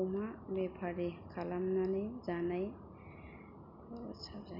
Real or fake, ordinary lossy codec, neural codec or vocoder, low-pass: real; none; none; 5.4 kHz